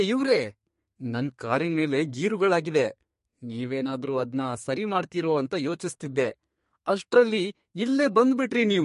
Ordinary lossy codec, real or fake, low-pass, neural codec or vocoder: MP3, 48 kbps; fake; 14.4 kHz; codec, 32 kHz, 1.9 kbps, SNAC